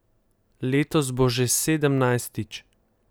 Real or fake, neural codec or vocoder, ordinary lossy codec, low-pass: real; none; none; none